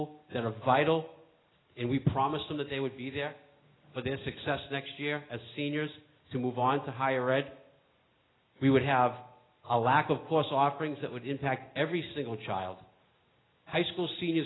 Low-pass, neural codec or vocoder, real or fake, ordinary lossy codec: 7.2 kHz; none; real; AAC, 16 kbps